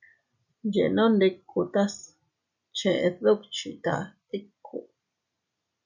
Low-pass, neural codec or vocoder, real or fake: 7.2 kHz; none; real